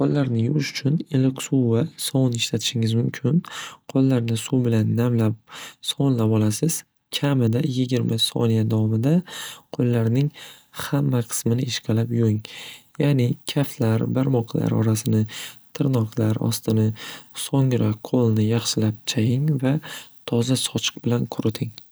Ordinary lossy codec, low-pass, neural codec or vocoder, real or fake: none; none; none; real